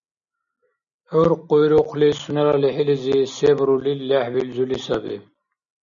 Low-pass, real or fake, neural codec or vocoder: 7.2 kHz; real; none